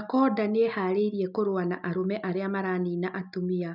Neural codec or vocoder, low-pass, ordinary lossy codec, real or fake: none; 5.4 kHz; none; real